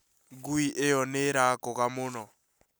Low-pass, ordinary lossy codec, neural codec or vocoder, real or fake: none; none; none; real